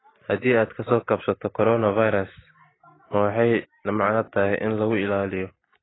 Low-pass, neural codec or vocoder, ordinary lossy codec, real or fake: 7.2 kHz; none; AAC, 16 kbps; real